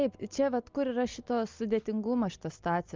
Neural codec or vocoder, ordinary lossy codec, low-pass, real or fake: none; Opus, 24 kbps; 7.2 kHz; real